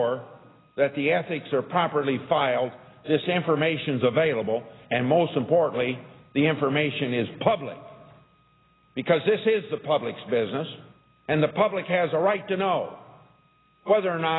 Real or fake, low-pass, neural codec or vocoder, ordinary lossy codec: real; 7.2 kHz; none; AAC, 16 kbps